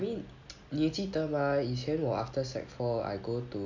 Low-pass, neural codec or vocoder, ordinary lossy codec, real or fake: 7.2 kHz; none; none; real